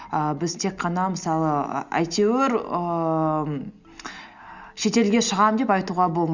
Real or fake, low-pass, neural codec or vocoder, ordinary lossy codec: real; 7.2 kHz; none; Opus, 64 kbps